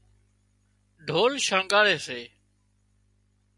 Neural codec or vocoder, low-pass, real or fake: none; 10.8 kHz; real